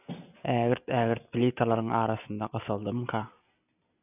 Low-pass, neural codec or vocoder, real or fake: 3.6 kHz; none; real